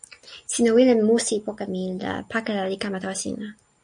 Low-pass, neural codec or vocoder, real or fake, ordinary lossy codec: 9.9 kHz; none; real; MP3, 96 kbps